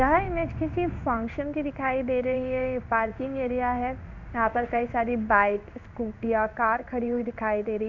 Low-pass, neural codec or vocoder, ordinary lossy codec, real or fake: 7.2 kHz; codec, 16 kHz in and 24 kHz out, 1 kbps, XY-Tokenizer; MP3, 64 kbps; fake